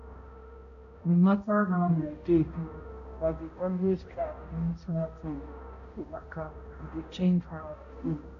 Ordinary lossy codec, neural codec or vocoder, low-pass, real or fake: AAC, 48 kbps; codec, 16 kHz, 0.5 kbps, X-Codec, HuBERT features, trained on balanced general audio; 7.2 kHz; fake